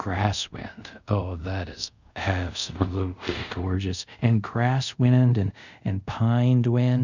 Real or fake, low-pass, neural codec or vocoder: fake; 7.2 kHz; codec, 24 kHz, 0.5 kbps, DualCodec